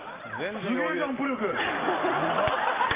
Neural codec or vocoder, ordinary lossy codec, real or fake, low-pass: none; Opus, 32 kbps; real; 3.6 kHz